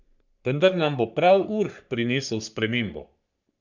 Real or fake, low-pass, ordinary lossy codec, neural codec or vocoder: fake; 7.2 kHz; none; codec, 44.1 kHz, 3.4 kbps, Pupu-Codec